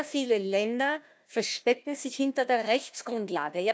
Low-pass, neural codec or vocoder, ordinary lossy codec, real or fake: none; codec, 16 kHz, 1 kbps, FunCodec, trained on Chinese and English, 50 frames a second; none; fake